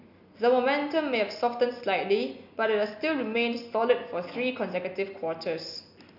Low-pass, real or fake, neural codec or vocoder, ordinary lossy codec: 5.4 kHz; real; none; none